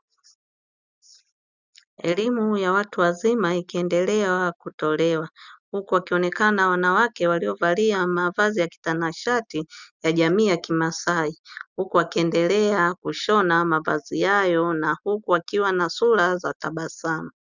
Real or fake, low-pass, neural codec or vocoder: real; 7.2 kHz; none